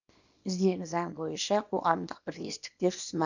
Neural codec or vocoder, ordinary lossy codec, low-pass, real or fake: codec, 24 kHz, 0.9 kbps, WavTokenizer, small release; none; 7.2 kHz; fake